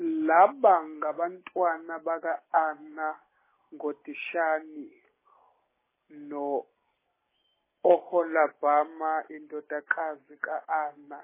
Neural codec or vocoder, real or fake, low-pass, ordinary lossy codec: none; real; 3.6 kHz; MP3, 16 kbps